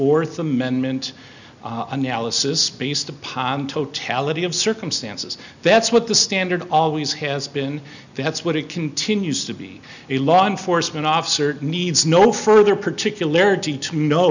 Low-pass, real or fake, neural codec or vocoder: 7.2 kHz; real; none